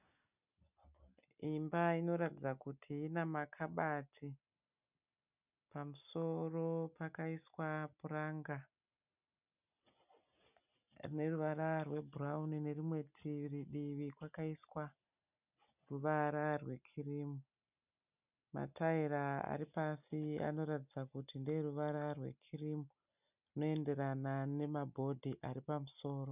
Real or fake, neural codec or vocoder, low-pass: real; none; 3.6 kHz